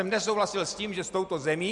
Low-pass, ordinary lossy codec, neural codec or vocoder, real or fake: 10.8 kHz; Opus, 64 kbps; none; real